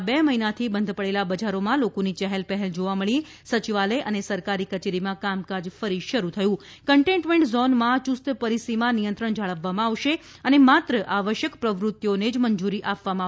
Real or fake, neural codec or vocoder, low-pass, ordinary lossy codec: real; none; none; none